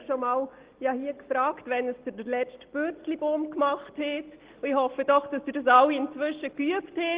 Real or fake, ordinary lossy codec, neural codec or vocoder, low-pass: real; Opus, 16 kbps; none; 3.6 kHz